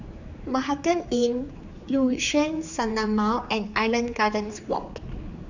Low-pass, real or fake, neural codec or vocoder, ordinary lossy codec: 7.2 kHz; fake; codec, 16 kHz, 4 kbps, X-Codec, HuBERT features, trained on general audio; none